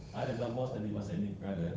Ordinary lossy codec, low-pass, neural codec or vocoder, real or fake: none; none; codec, 16 kHz, 8 kbps, FunCodec, trained on Chinese and English, 25 frames a second; fake